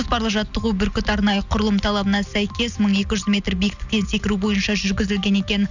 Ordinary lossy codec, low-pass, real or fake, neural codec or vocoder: none; 7.2 kHz; real; none